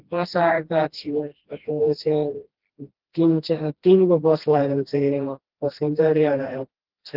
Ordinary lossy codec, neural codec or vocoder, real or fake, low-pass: Opus, 16 kbps; codec, 16 kHz, 1 kbps, FreqCodec, smaller model; fake; 5.4 kHz